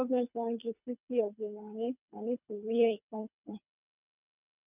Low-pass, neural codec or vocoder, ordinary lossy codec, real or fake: 3.6 kHz; codec, 24 kHz, 3 kbps, HILCodec; none; fake